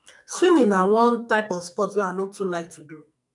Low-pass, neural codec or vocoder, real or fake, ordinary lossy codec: 10.8 kHz; codec, 44.1 kHz, 2.6 kbps, SNAC; fake; none